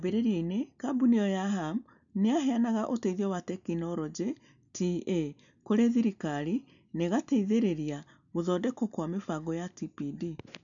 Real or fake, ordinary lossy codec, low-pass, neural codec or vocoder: real; none; 7.2 kHz; none